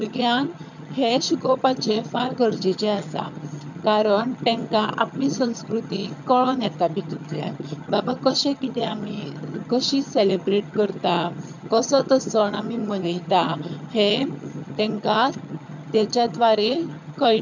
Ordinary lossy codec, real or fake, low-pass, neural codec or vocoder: none; fake; 7.2 kHz; vocoder, 22.05 kHz, 80 mel bands, HiFi-GAN